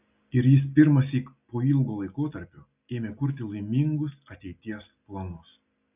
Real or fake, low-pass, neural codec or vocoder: real; 3.6 kHz; none